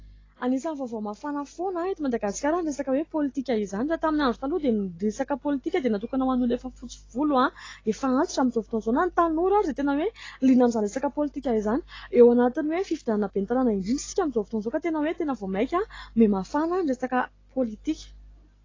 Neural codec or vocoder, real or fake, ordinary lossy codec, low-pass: none; real; AAC, 32 kbps; 7.2 kHz